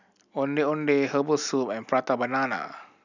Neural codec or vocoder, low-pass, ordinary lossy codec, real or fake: none; 7.2 kHz; none; real